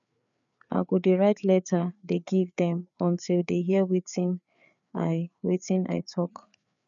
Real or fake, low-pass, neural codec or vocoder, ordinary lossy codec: fake; 7.2 kHz; codec, 16 kHz, 4 kbps, FreqCodec, larger model; none